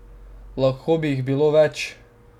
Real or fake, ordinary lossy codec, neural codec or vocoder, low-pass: real; none; none; 19.8 kHz